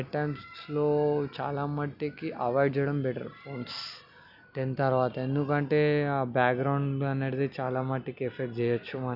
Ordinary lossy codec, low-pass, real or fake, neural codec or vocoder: none; 5.4 kHz; real; none